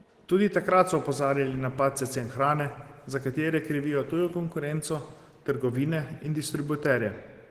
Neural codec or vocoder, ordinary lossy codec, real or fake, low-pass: none; Opus, 16 kbps; real; 14.4 kHz